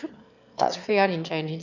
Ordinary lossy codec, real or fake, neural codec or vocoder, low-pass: none; fake; autoencoder, 22.05 kHz, a latent of 192 numbers a frame, VITS, trained on one speaker; 7.2 kHz